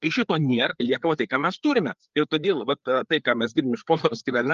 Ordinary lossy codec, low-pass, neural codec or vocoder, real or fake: Opus, 32 kbps; 7.2 kHz; codec, 16 kHz, 4 kbps, FreqCodec, larger model; fake